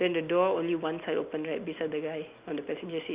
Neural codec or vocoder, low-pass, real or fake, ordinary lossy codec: none; 3.6 kHz; real; Opus, 64 kbps